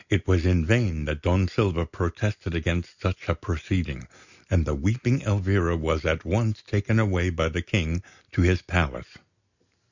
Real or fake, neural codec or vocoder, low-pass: real; none; 7.2 kHz